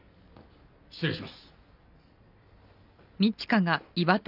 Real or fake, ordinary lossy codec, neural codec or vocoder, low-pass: real; none; none; 5.4 kHz